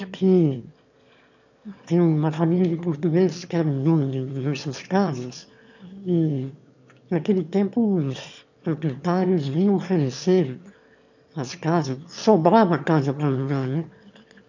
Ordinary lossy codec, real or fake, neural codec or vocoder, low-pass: none; fake; autoencoder, 22.05 kHz, a latent of 192 numbers a frame, VITS, trained on one speaker; 7.2 kHz